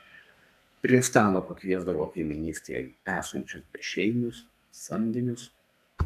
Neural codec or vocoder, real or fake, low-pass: codec, 32 kHz, 1.9 kbps, SNAC; fake; 14.4 kHz